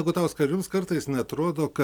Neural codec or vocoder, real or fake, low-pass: none; real; 19.8 kHz